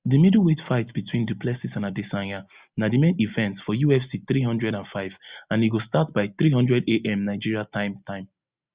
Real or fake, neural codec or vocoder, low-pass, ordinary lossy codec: real; none; 3.6 kHz; Opus, 64 kbps